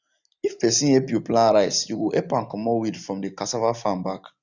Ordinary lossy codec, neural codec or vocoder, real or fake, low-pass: none; none; real; 7.2 kHz